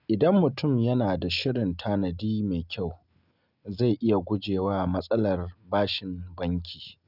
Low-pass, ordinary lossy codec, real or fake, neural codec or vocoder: 5.4 kHz; none; real; none